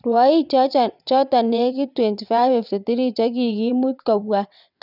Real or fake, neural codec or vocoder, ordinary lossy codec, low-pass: fake; vocoder, 22.05 kHz, 80 mel bands, WaveNeXt; none; 5.4 kHz